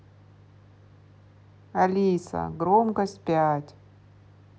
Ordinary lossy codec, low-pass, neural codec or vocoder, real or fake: none; none; none; real